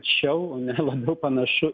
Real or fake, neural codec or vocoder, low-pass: real; none; 7.2 kHz